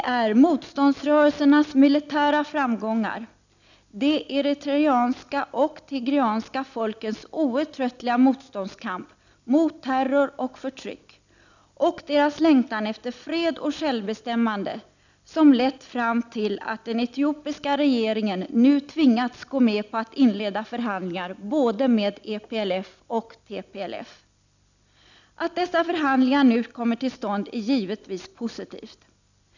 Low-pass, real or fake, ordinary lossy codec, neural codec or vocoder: 7.2 kHz; real; none; none